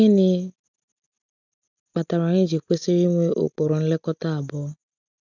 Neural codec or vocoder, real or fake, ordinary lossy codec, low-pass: none; real; none; 7.2 kHz